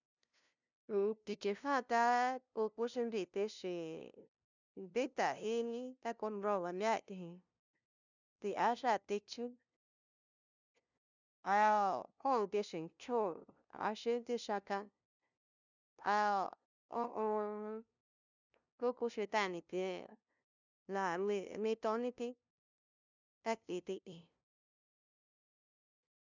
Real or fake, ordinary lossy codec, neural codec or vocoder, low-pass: fake; none; codec, 16 kHz, 0.5 kbps, FunCodec, trained on LibriTTS, 25 frames a second; 7.2 kHz